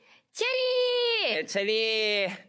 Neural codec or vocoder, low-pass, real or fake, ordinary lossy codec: codec, 16 kHz, 4 kbps, FunCodec, trained on Chinese and English, 50 frames a second; none; fake; none